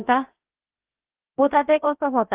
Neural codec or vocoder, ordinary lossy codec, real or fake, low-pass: codec, 16 kHz, 0.7 kbps, FocalCodec; Opus, 16 kbps; fake; 3.6 kHz